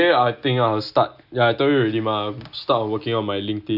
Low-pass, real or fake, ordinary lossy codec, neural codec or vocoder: 5.4 kHz; real; none; none